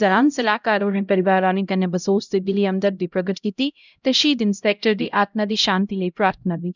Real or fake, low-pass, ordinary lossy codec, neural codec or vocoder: fake; 7.2 kHz; none; codec, 16 kHz, 0.5 kbps, X-Codec, HuBERT features, trained on LibriSpeech